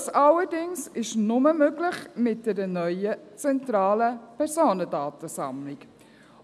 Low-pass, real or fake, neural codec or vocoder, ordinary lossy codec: none; real; none; none